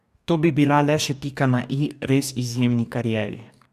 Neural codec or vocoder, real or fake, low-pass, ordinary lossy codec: codec, 44.1 kHz, 2.6 kbps, DAC; fake; 14.4 kHz; none